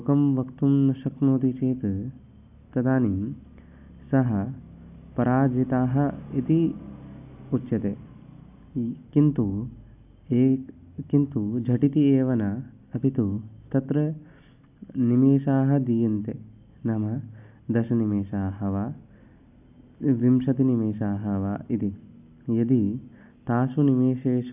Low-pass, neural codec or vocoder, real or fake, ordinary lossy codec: 3.6 kHz; none; real; AAC, 32 kbps